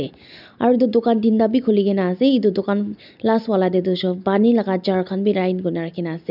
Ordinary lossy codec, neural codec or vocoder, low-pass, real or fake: none; none; 5.4 kHz; real